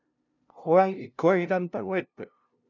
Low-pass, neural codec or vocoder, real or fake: 7.2 kHz; codec, 16 kHz, 0.5 kbps, FunCodec, trained on LibriTTS, 25 frames a second; fake